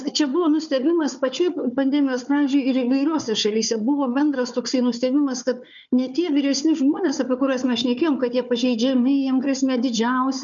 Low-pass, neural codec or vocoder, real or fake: 7.2 kHz; codec, 16 kHz, 4 kbps, FunCodec, trained on Chinese and English, 50 frames a second; fake